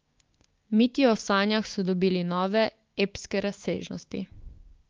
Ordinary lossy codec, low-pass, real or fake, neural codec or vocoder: Opus, 32 kbps; 7.2 kHz; fake; codec, 16 kHz, 6 kbps, DAC